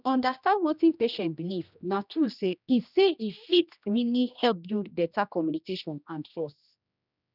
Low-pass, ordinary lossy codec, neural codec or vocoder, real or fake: 5.4 kHz; none; codec, 16 kHz, 1 kbps, X-Codec, HuBERT features, trained on general audio; fake